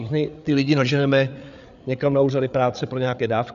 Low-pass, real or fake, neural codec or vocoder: 7.2 kHz; fake; codec, 16 kHz, 8 kbps, FreqCodec, larger model